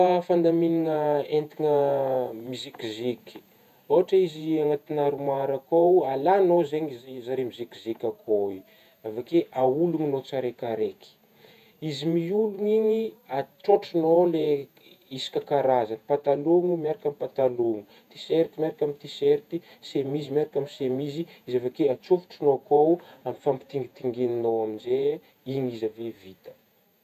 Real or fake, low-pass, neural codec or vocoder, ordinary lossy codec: fake; 14.4 kHz; vocoder, 48 kHz, 128 mel bands, Vocos; none